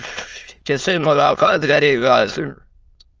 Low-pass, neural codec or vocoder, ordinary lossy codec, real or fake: 7.2 kHz; autoencoder, 22.05 kHz, a latent of 192 numbers a frame, VITS, trained on many speakers; Opus, 24 kbps; fake